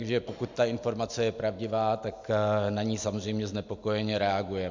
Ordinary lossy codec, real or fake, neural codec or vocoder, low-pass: MP3, 64 kbps; real; none; 7.2 kHz